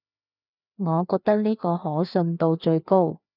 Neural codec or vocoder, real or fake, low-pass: codec, 16 kHz, 2 kbps, FreqCodec, larger model; fake; 5.4 kHz